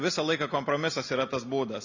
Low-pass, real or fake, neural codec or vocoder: 7.2 kHz; real; none